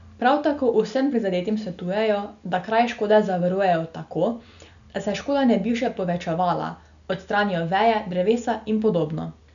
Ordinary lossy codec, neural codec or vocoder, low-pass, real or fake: none; none; 7.2 kHz; real